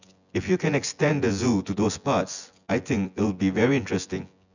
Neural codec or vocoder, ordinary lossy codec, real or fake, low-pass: vocoder, 24 kHz, 100 mel bands, Vocos; none; fake; 7.2 kHz